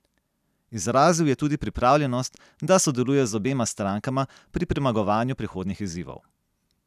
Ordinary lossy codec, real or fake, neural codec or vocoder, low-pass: none; real; none; 14.4 kHz